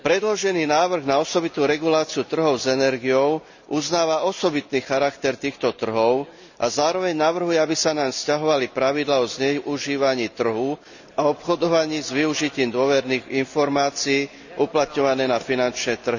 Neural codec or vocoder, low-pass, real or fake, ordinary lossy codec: none; 7.2 kHz; real; none